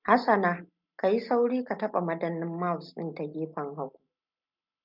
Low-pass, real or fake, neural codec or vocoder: 5.4 kHz; real; none